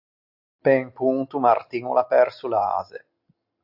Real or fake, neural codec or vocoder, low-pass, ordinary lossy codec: real; none; 5.4 kHz; AAC, 48 kbps